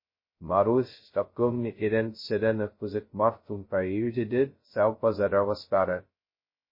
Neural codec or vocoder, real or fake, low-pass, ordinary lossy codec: codec, 16 kHz, 0.2 kbps, FocalCodec; fake; 5.4 kHz; MP3, 24 kbps